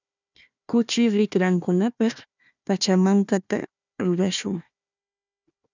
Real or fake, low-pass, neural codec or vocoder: fake; 7.2 kHz; codec, 16 kHz, 1 kbps, FunCodec, trained on Chinese and English, 50 frames a second